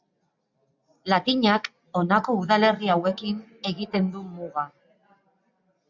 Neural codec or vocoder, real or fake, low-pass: none; real; 7.2 kHz